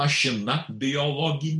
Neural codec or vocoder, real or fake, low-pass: none; real; 10.8 kHz